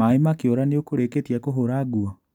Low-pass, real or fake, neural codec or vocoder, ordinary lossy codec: 19.8 kHz; real; none; none